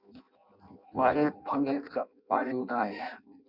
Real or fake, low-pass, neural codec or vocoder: fake; 5.4 kHz; codec, 16 kHz in and 24 kHz out, 0.6 kbps, FireRedTTS-2 codec